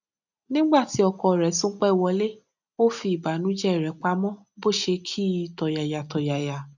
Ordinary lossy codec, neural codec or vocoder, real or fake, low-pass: none; none; real; 7.2 kHz